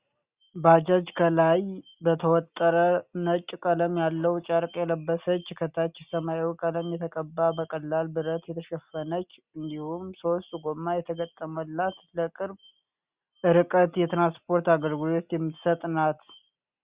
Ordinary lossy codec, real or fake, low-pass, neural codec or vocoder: Opus, 64 kbps; real; 3.6 kHz; none